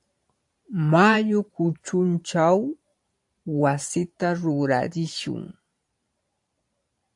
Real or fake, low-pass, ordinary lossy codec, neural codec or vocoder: fake; 10.8 kHz; AAC, 64 kbps; vocoder, 44.1 kHz, 128 mel bands every 512 samples, BigVGAN v2